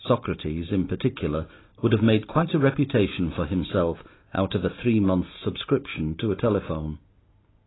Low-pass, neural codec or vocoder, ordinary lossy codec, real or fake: 7.2 kHz; none; AAC, 16 kbps; real